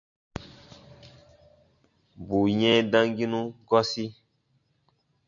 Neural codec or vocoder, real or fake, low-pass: none; real; 7.2 kHz